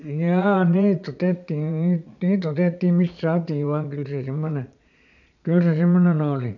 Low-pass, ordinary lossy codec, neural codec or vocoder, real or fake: 7.2 kHz; none; vocoder, 22.05 kHz, 80 mel bands, Vocos; fake